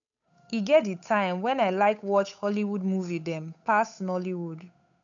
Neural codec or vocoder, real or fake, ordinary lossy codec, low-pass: codec, 16 kHz, 8 kbps, FunCodec, trained on Chinese and English, 25 frames a second; fake; MP3, 96 kbps; 7.2 kHz